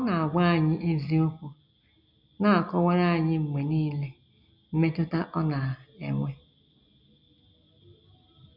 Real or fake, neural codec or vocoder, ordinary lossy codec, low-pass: real; none; none; 5.4 kHz